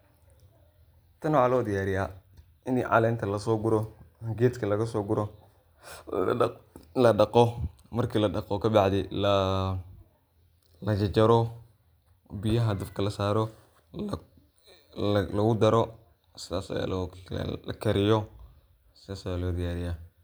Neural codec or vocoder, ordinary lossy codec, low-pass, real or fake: none; none; none; real